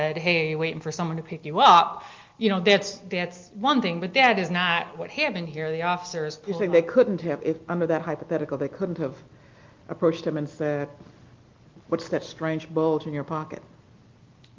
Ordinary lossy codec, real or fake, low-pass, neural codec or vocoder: Opus, 32 kbps; real; 7.2 kHz; none